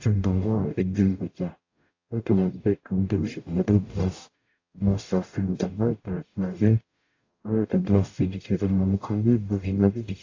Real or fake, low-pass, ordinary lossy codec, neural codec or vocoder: fake; 7.2 kHz; AAC, 48 kbps; codec, 44.1 kHz, 0.9 kbps, DAC